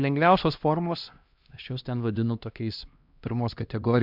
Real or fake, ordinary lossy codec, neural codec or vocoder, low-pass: fake; MP3, 48 kbps; codec, 16 kHz, 1 kbps, X-Codec, HuBERT features, trained on LibriSpeech; 5.4 kHz